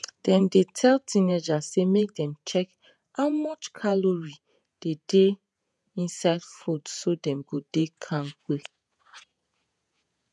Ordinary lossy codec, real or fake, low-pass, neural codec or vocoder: none; fake; 10.8 kHz; vocoder, 48 kHz, 128 mel bands, Vocos